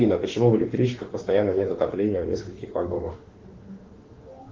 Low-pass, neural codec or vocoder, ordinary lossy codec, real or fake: 7.2 kHz; autoencoder, 48 kHz, 32 numbers a frame, DAC-VAE, trained on Japanese speech; Opus, 16 kbps; fake